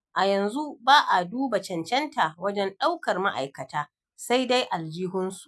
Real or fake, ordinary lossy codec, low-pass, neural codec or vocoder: real; none; none; none